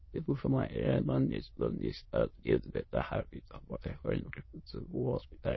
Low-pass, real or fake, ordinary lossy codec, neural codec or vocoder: 7.2 kHz; fake; MP3, 24 kbps; autoencoder, 22.05 kHz, a latent of 192 numbers a frame, VITS, trained on many speakers